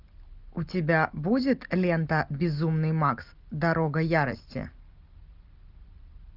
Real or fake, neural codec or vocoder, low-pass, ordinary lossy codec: real; none; 5.4 kHz; Opus, 24 kbps